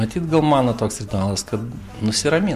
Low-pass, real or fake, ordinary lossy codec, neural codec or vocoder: 14.4 kHz; real; MP3, 64 kbps; none